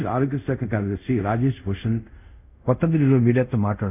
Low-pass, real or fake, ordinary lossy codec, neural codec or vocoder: 3.6 kHz; fake; none; codec, 24 kHz, 0.5 kbps, DualCodec